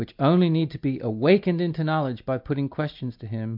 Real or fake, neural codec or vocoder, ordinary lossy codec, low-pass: real; none; MP3, 48 kbps; 5.4 kHz